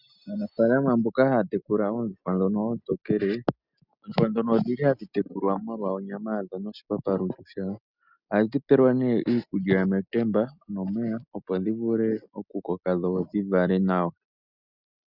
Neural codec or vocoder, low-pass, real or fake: none; 5.4 kHz; real